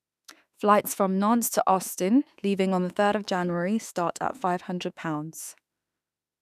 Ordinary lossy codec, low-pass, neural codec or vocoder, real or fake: none; 14.4 kHz; autoencoder, 48 kHz, 32 numbers a frame, DAC-VAE, trained on Japanese speech; fake